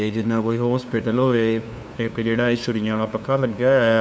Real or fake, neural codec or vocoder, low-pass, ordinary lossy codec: fake; codec, 16 kHz, 2 kbps, FunCodec, trained on LibriTTS, 25 frames a second; none; none